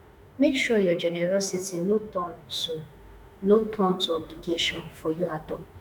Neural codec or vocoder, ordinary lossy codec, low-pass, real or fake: autoencoder, 48 kHz, 32 numbers a frame, DAC-VAE, trained on Japanese speech; none; none; fake